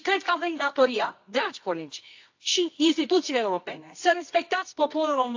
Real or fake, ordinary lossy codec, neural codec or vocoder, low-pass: fake; AAC, 48 kbps; codec, 24 kHz, 0.9 kbps, WavTokenizer, medium music audio release; 7.2 kHz